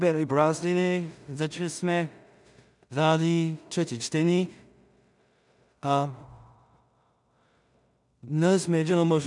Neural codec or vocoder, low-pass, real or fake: codec, 16 kHz in and 24 kHz out, 0.4 kbps, LongCat-Audio-Codec, two codebook decoder; 10.8 kHz; fake